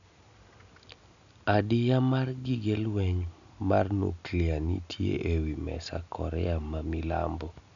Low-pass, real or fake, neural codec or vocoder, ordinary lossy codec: 7.2 kHz; real; none; none